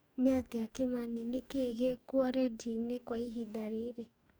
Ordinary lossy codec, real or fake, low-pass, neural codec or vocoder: none; fake; none; codec, 44.1 kHz, 2.6 kbps, DAC